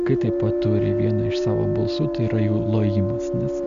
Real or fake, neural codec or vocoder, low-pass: real; none; 7.2 kHz